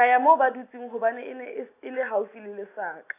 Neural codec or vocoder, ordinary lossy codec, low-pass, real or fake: none; AAC, 24 kbps; 3.6 kHz; real